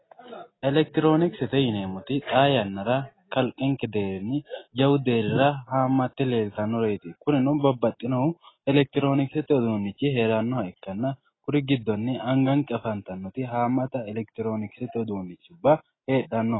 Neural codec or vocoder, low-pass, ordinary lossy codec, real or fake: none; 7.2 kHz; AAC, 16 kbps; real